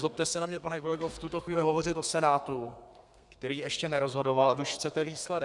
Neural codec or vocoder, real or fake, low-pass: codec, 32 kHz, 1.9 kbps, SNAC; fake; 10.8 kHz